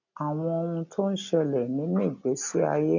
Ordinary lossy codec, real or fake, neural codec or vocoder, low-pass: none; real; none; 7.2 kHz